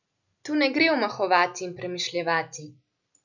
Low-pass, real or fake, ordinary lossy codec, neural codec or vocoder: 7.2 kHz; real; none; none